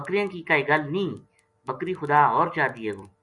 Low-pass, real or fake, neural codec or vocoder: 10.8 kHz; real; none